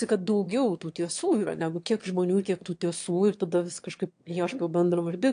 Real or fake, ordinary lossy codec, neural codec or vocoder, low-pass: fake; AAC, 64 kbps; autoencoder, 22.05 kHz, a latent of 192 numbers a frame, VITS, trained on one speaker; 9.9 kHz